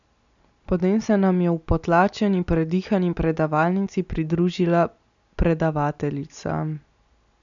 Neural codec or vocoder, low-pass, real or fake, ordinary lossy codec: none; 7.2 kHz; real; none